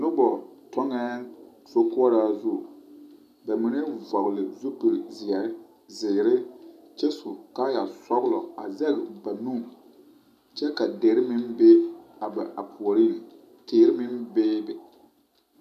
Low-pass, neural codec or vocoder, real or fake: 14.4 kHz; none; real